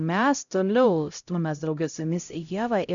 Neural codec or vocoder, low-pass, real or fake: codec, 16 kHz, 0.5 kbps, X-Codec, HuBERT features, trained on LibriSpeech; 7.2 kHz; fake